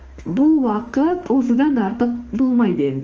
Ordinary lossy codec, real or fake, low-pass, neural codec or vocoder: Opus, 24 kbps; fake; 7.2 kHz; autoencoder, 48 kHz, 32 numbers a frame, DAC-VAE, trained on Japanese speech